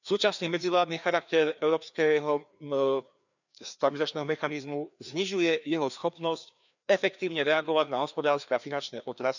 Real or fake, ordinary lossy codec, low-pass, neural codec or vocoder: fake; none; 7.2 kHz; codec, 16 kHz, 2 kbps, FreqCodec, larger model